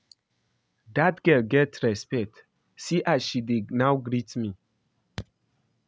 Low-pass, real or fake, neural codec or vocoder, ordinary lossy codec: none; real; none; none